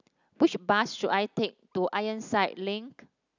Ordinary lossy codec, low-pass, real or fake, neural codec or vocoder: none; 7.2 kHz; real; none